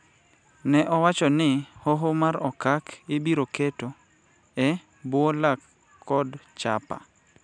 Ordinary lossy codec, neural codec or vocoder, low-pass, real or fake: none; none; 9.9 kHz; real